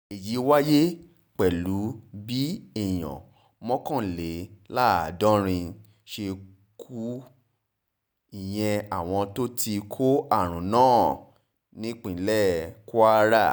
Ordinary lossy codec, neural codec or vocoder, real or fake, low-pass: none; none; real; none